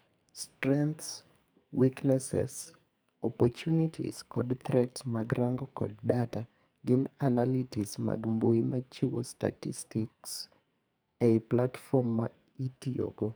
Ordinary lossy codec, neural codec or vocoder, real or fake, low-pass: none; codec, 44.1 kHz, 2.6 kbps, SNAC; fake; none